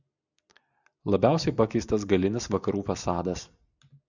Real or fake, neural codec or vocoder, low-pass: real; none; 7.2 kHz